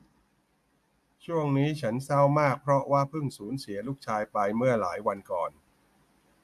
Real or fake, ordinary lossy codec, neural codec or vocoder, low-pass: real; AAC, 96 kbps; none; 14.4 kHz